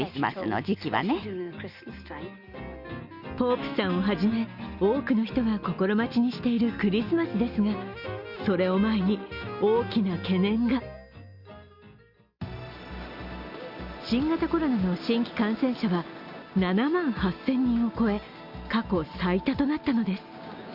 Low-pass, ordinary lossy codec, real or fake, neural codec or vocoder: 5.4 kHz; Opus, 64 kbps; real; none